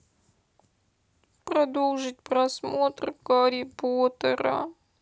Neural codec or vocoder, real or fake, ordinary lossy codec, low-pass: none; real; none; none